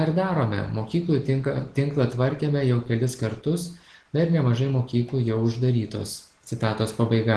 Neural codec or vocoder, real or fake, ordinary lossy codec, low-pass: none; real; Opus, 16 kbps; 10.8 kHz